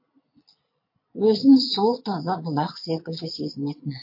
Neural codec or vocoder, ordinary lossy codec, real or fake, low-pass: vocoder, 22.05 kHz, 80 mel bands, Vocos; MP3, 32 kbps; fake; 5.4 kHz